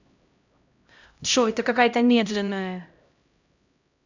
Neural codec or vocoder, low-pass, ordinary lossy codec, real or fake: codec, 16 kHz, 0.5 kbps, X-Codec, HuBERT features, trained on LibriSpeech; 7.2 kHz; none; fake